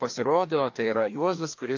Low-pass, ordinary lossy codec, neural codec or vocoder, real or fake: 7.2 kHz; AAC, 48 kbps; codec, 16 kHz, 2 kbps, FreqCodec, larger model; fake